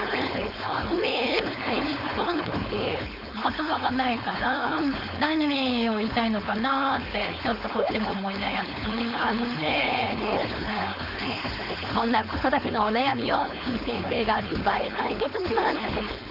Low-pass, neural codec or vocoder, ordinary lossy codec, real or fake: 5.4 kHz; codec, 16 kHz, 4.8 kbps, FACodec; none; fake